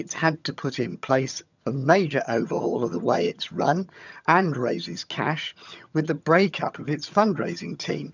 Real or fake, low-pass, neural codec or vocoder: fake; 7.2 kHz; vocoder, 22.05 kHz, 80 mel bands, HiFi-GAN